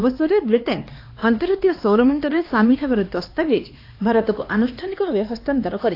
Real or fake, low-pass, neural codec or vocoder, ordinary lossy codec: fake; 5.4 kHz; codec, 16 kHz, 2 kbps, X-Codec, HuBERT features, trained on LibriSpeech; AAC, 32 kbps